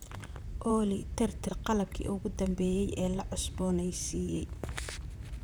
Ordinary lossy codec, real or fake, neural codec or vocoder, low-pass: none; fake; vocoder, 44.1 kHz, 128 mel bands, Pupu-Vocoder; none